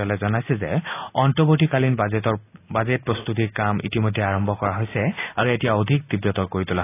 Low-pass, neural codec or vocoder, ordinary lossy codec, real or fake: 3.6 kHz; none; AAC, 24 kbps; real